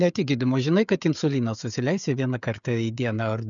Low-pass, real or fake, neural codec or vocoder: 7.2 kHz; fake; codec, 16 kHz, 16 kbps, FreqCodec, smaller model